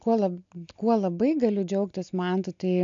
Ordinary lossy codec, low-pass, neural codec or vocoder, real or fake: MP3, 64 kbps; 7.2 kHz; none; real